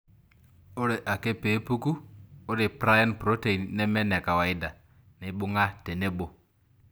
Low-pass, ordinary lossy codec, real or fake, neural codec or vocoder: none; none; real; none